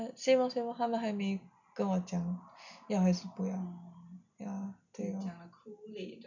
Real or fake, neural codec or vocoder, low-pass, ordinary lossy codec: real; none; 7.2 kHz; none